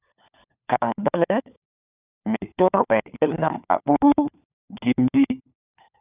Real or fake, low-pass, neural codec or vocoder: fake; 3.6 kHz; codec, 16 kHz, 4 kbps, FunCodec, trained on LibriTTS, 50 frames a second